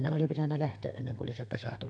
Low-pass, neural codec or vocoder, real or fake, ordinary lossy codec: 9.9 kHz; codec, 32 kHz, 1.9 kbps, SNAC; fake; none